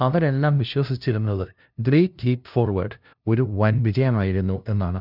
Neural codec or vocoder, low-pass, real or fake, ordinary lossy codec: codec, 16 kHz, 0.5 kbps, FunCodec, trained on LibriTTS, 25 frames a second; 5.4 kHz; fake; none